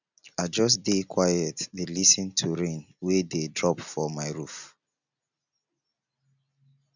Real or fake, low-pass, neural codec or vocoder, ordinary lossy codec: real; 7.2 kHz; none; none